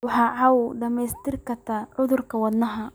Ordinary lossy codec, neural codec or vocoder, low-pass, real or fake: none; none; none; real